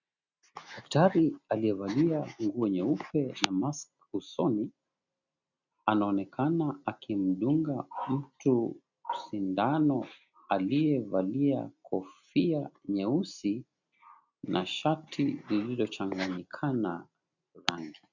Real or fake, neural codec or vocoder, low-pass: real; none; 7.2 kHz